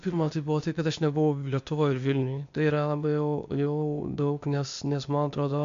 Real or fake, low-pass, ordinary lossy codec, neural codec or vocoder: fake; 7.2 kHz; MP3, 64 kbps; codec, 16 kHz, 0.8 kbps, ZipCodec